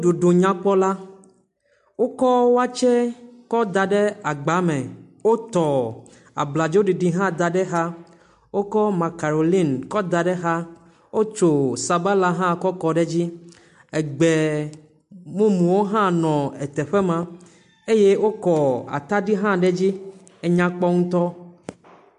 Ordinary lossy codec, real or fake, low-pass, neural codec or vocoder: MP3, 64 kbps; real; 10.8 kHz; none